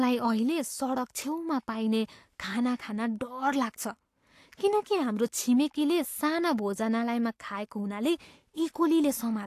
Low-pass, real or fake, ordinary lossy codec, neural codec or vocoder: 14.4 kHz; fake; AAC, 64 kbps; codec, 44.1 kHz, 7.8 kbps, Pupu-Codec